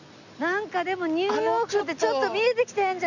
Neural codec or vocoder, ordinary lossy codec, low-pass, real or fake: none; none; 7.2 kHz; real